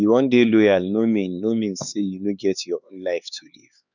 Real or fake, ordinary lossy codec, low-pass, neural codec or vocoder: fake; none; 7.2 kHz; codec, 16 kHz, 4 kbps, X-Codec, HuBERT features, trained on LibriSpeech